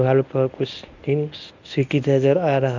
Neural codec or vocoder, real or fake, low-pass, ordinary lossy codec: codec, 24 kHz, 0.9 kbps, WavTokenizer, medium speech release version 1; fake; 7.2 kHz; none